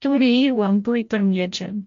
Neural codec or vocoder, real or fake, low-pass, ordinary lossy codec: codec, 16 kHz, 0.5 kbps, FreqCodec, larger model; fake; 7.2 kHz; MP3, 48 kbps